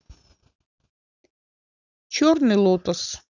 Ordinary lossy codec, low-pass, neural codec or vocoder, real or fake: none; 7.2 kHz; none; real